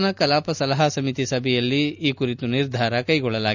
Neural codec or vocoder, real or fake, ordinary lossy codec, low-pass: none; real; none; 7.2 kHz